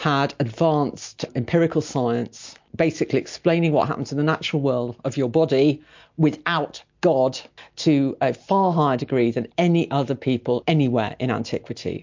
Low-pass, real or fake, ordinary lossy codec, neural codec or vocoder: 7.2 kHz; real; MP3, 48 kbps; none